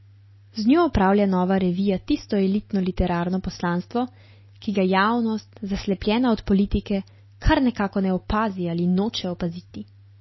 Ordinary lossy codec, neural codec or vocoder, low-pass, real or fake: MP3, 24 kbps; none; 7.2 kHz; real